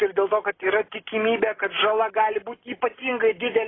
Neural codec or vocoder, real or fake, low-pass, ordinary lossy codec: none; real; 7.2 kHz; AAC, 16 kbps